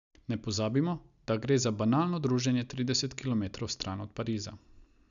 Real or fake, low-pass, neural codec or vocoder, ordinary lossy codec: real; 7.2 kHz; none; none